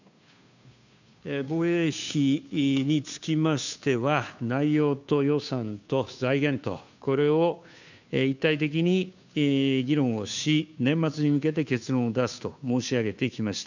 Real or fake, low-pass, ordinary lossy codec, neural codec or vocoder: fake; 7.2 kHz; none; codec, 16 kHz, 2 kbps, FunCodec, trained on Chinese and English, 25 frames a second